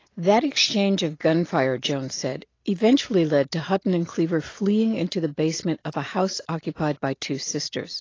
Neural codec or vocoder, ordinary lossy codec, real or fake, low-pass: none; AAC, 32 kbps; real; 7.2 kHz